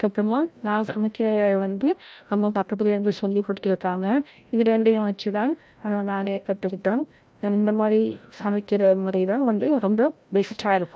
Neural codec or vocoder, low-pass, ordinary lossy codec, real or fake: codec, 16 kHz, 0.5 kbps, FreqCodec, larger model; none; none; fake